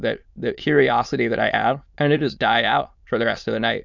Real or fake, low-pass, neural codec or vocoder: fake; 7.2 kHz; autoencoder, 22.05 kHz, a latent of 192 numbers a frame, VITS, trained on many speakers